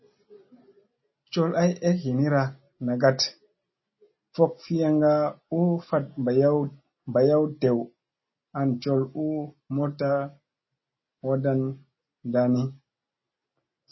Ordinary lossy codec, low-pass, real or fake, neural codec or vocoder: MP3, 24 kbps; 7.2 kHz; real; none